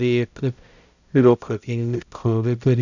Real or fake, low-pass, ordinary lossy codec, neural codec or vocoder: fake; 7.2 kHz; none; codec, 16 kHz, 0.5 kbps, X-Codec, HuBERT features, trained on balanced general audio